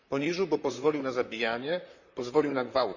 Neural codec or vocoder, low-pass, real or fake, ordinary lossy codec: vocoder, 44.1 kHz, 128 mel bands, Pupu-Vocoder; 7.2 kHz; fake; none